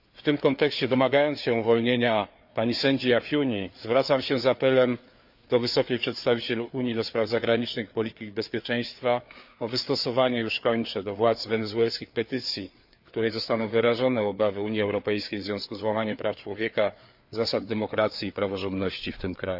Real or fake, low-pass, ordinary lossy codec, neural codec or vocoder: fake; 5.4 kHz; Opus, 64 kbps; codec, 16 kHz, 4 kbps, FreqCodec, larger model